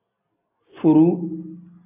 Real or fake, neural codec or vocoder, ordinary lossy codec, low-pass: real; none; AAC, 32 kbps; 3.6 kHz